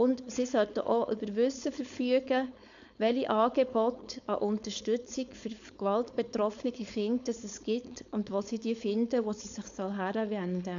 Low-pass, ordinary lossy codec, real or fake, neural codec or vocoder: 7.2 kHz; none; fake; codec, 16 kHz, 4.8 kbps, FACodec